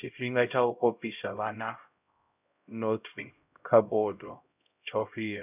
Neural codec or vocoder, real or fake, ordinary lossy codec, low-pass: codec, 16 kHz, 0.5 kbps, X-Codec, HuBERT features, trained on LibriSpeech; fake; none; 3.6 kHz